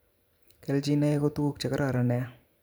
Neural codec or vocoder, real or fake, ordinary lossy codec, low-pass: vocoder, 44.1 kHz, 128 mel bands every 512 samples, BigVGAN v2; fake; none; none